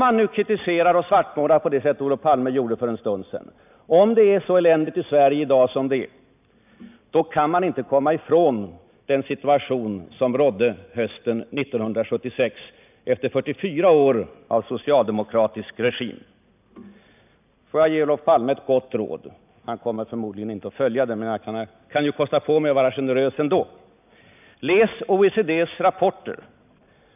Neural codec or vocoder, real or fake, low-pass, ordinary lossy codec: none; real; 3.6 kHz; none